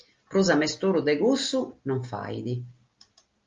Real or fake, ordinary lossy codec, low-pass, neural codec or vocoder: real; Opus, 32 kbps; 7.2 kHz; none